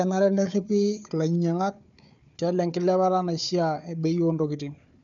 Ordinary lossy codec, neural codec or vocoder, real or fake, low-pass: none; codec, 16 kHz, 4 kbps, FunCodec, trained on Chinese and English, 50 frames a second; fake; 7.2 kHz